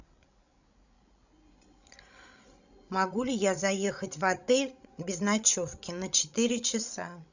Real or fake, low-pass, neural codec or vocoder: fake; 7.2 kHz; codec, 16 kHz, 16 kbps, FreqCodec, larger model